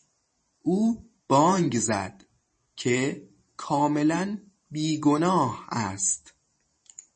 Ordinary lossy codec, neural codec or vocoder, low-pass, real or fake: MP3, 32 kbps; vocoder, 44.1 kHz, 128 mel bands every 512 samples, BigVGAN v2; 10.8 kHz; fake